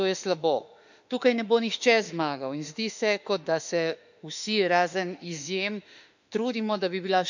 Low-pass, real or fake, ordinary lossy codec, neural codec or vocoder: 7.2 kHz; fake; none; autoencoder, 48 kHz, 32 numbers a frame, DAC-VAE, trained on Japanese speech